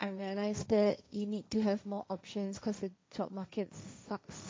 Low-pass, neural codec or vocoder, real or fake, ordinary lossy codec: none; codec, 16 kHz, 1.1 kbps, Voila-Tokenizer; fake; none